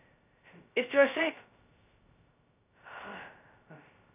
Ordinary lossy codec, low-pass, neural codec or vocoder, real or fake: none; 3.6 kHz; codec, 16 kHz, 0.2 kbps, FocalCodec; fake